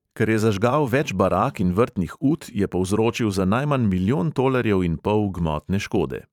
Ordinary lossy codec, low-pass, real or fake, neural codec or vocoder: none; 19.8 kHz; fake; vocoder, 44.1 kHz, 128 mel bands every 512 samples, BigVGAN v2